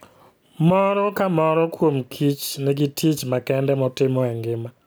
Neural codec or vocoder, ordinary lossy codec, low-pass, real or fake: none; none; none; real